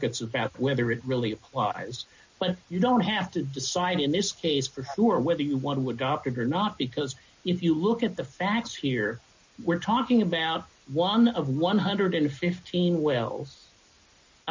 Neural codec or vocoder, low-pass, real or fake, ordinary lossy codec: none; 7.2 kHz; real; MP3, 48 kbps